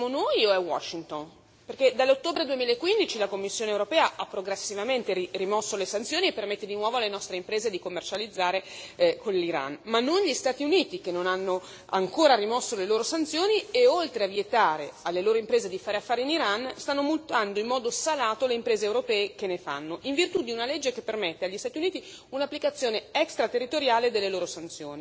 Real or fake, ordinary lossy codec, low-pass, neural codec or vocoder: real; none; none; none